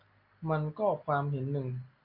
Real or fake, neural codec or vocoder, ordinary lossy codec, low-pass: real; none; MP3, 48 kbps; 5.4 kHz